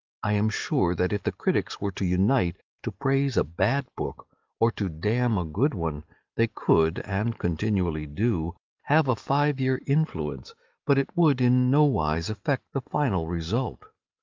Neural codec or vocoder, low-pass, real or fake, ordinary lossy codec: none; 7.2 kHz; real; Opus, 32 kbps